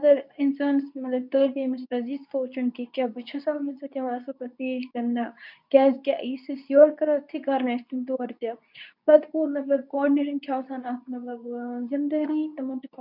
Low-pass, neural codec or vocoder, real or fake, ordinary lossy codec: 5.4 kHz; codec, 24 kHz, 0.9 kbps, WavTokenizer, medium speech release version 2; fake; none